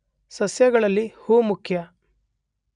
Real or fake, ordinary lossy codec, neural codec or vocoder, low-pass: real; none; none; 9.9 kHz